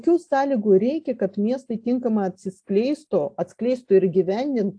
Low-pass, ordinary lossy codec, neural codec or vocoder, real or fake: 9.9 kHz; AAC, 64 kbps; none; real